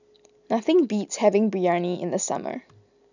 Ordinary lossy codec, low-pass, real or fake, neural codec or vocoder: none; 7.2 kHz; real; none